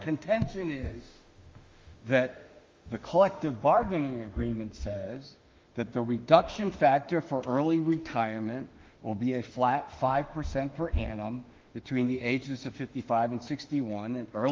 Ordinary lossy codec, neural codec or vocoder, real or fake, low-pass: Opus, 32 kbps; autoencoder, 48 kHz, 32 numbers a frame, DAC-VAE, trained on Japanese speech; fake; 7.2 kHz